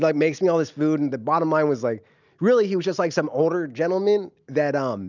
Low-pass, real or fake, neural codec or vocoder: 7.2 kHz; real; none